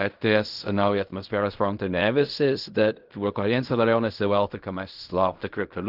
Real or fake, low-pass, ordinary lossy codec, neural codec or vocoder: fake; 5.4 kHz; Opus, 24 kbps; codec, 16 kHz in and 24 kHz out, 0.4 kbps, LongCat-Audio-Codec, fine tuned four codebook decoder